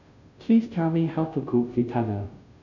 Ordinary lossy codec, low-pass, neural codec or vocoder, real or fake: none; 7.2 kHz; codec, 16 kHz, 0.5 kbps, FunCodec, trained on Chinese and English, 25 frames a second; fake